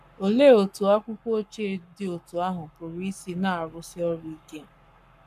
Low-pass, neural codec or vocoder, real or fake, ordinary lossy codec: 14.4 kHz; codec, 44.1 kHz, 7.8 kbps, Pupu-Codec; fake; none